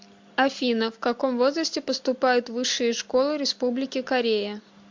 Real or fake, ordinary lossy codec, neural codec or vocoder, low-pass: real; MP3, 48 kbps; none; 7.2 kHz